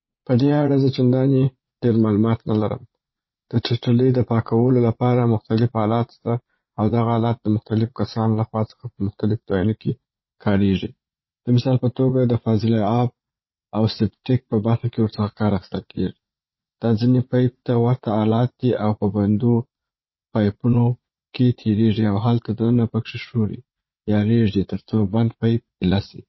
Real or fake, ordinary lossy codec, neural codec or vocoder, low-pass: fake; MP3, 24 kbps; vocoder, 44.1 kHz, 80 mel bands, Vocos; 7.2 kHz